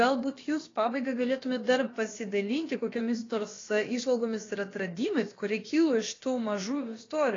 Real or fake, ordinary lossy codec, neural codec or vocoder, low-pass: fake; AAC, 32 kbps; codec, 16 kHz, about 1 kbps, DyCAST, with the encoder's durations; 7.2 kHz